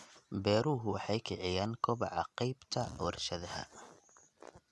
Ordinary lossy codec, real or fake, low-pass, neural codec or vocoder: none; real; none; none